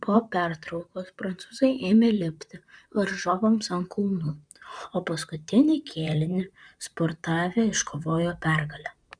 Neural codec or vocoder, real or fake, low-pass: vocoder, 44.1 kHz, 128 mel bands, Pupu-Vocoder; fake; 9.9 kHz